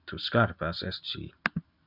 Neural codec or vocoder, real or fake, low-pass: none; real; 5.4 kHz